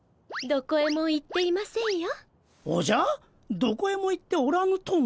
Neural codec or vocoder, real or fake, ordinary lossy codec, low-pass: none; real; none; none